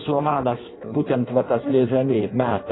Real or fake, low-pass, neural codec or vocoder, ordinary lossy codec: fake; 7.2 kHz; codec, 16 kHz in and 24 kHz out, 0.6 kbps, FireRedTTS-2 codec; AAC, 16 kbps